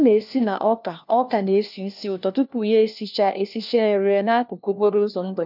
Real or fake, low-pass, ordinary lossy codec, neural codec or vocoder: fake; 5.4 kHz; none; codec, 16 kHz, 1 kbps, FunCodec, trained on LibriTTS, 50 frames a second